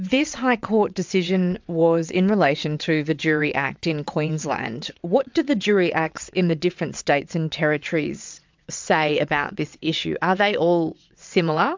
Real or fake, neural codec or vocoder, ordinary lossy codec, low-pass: fake; vocoder, 22.05 kHz, 80 mel bands, WaveNeXt; MP3, 64 kbps; 7.2 kHz